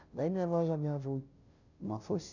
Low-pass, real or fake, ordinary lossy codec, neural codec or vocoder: 7.2 kHz; fake; none; codec, 16 kHz, 0.5 kbps, FunCodec, trained on Chinese and English, 25 frames a second